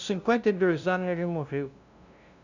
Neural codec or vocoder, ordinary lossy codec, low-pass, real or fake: codec, 16 kHz, 0.5 kbps, FunCodec, trained on LibriTTS, 25 frames a second; none; 7.2 kHz; fake